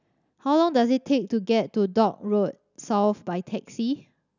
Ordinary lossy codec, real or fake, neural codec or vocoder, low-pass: none; real; none; 7.2 kHz